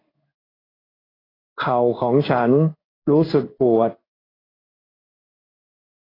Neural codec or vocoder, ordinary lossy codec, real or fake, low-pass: codec, 16 kHz in and 24 kHz out, 1 kbps, XY-Tokenizer; AAC, 24 kbps; fake; 5.4 kHz